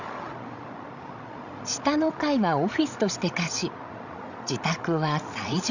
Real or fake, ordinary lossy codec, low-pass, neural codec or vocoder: fake; none; 7.2 kHz; codec, 16 kHz, 16 kbps, FreqCodec, larger model